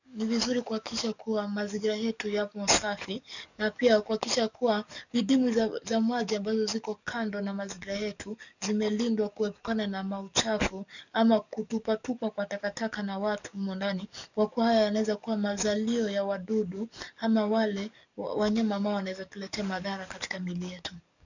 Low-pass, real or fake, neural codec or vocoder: 7.2 kHz; fake; codec, 44.1 kHz, 7.8 kbps, DAC